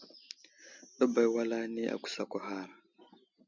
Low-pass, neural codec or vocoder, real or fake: 7.2 kHz; none; real